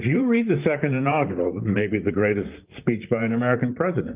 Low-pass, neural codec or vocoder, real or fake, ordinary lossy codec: 3.6 kHz; vocoder, 44.1 kHz, 128 mel bands, Pupu-Vocoder; fake; Opus, 24 kbps